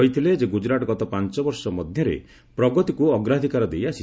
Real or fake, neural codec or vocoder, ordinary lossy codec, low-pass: real; none; none; none